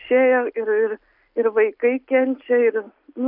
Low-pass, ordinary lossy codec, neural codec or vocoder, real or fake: 5.4 kHz; AAC, 48 kbps; none; real